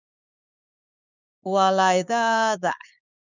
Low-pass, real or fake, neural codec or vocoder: 7.2 kHz; fake; codec, 16 kHz, 6 kbps, DAC